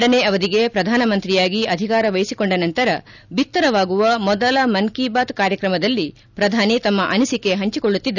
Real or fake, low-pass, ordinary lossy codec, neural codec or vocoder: real; 7.2 kHz; none; none